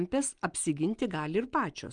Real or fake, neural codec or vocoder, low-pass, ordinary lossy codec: real; none; 9.9 kHz; Opus, 32 kbps